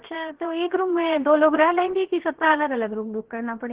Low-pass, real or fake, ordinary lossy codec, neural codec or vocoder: 3.6 kHz; fake; Opus, 16 kbps; codec, 16 kHz, 0.7 kbps, FocalCodec